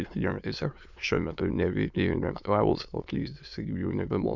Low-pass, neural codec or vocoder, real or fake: 7.2 kHz; autoencoder, 22.05 kHz, a latent of 192 numbers a frame, VITS, trained on many speakers; fake